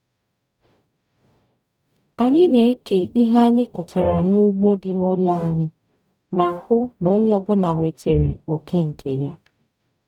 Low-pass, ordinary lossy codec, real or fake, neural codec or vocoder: 19.8 kHz; none; fake; codec, 44.1 kHz, 0.9 kbps, DAC